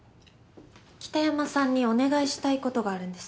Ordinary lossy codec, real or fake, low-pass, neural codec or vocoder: none; real; none; none